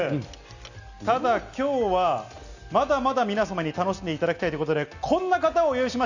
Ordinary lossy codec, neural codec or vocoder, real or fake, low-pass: none; none; real; 7.2 kHz